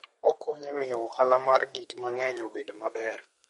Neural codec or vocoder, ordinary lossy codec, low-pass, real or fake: codec, 32 kHz, 1.9 kbps, SNAC; MP3, 48 kbps; 14.4 kHz; fake